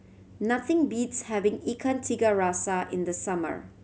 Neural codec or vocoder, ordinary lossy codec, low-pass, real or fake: none; none; none; real